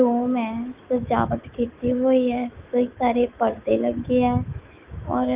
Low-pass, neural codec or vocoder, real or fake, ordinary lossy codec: 3.6 kHz; autoencoder, 48 kHz, 128 numbers a frame, DAC-VAE, trained on Japanese speech; fake; Opus, 32 kbps